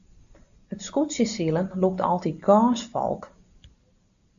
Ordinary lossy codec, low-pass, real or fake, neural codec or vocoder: AAC, 96 kbps; 7.2 kHz; real; none